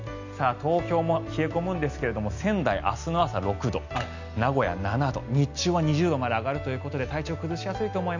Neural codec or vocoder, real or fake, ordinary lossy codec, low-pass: none; real; none; 7.2 kHz